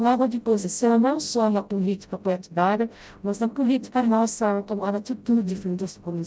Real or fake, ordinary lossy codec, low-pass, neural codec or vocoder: fake; none; none; codec, 16 kHz, 0.5 kbps, FreqCodec, smaller model